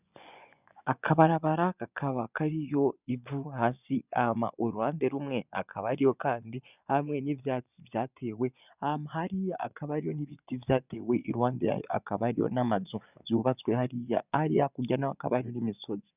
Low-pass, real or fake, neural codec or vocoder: 3.6 kHz; fake; vocoder, 24 kHz, 100 mel bands, Vocos